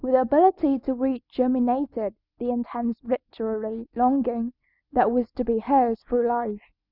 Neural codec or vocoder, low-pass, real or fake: none; 5.4 kHz; real